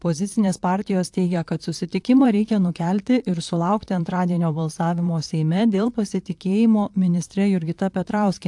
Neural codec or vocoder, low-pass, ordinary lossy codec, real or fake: vocoder, 44.1 kHz, 128 mel bands, Pupu-Vocoder; 10.8 kHz; AAC, 64 kbps; fake